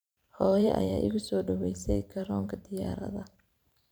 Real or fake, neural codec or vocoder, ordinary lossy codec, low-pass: fake; vocoder, 44.1 kHz, 128 mel bands every 512 samples, BigVGAN v2; none; none